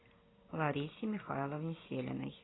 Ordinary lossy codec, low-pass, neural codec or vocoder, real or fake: AAC, 16 kbps; 7.2 kHz; none; real